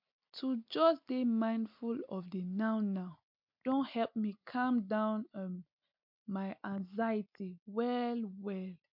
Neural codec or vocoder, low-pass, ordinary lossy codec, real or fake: none; 5.4 kHz; MP3, 48 kbps; real